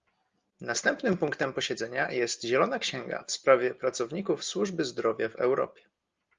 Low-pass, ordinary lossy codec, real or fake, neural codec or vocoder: 7.2 kHz; Opus, 32 kbps; real; none